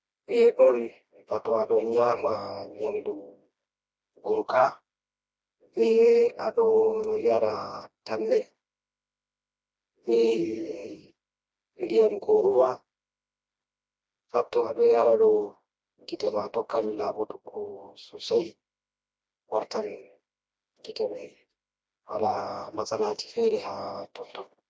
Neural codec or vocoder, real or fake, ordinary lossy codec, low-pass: codec, 16 kHz, 1 kbps, FreqCodec, smaller model; fake; none; none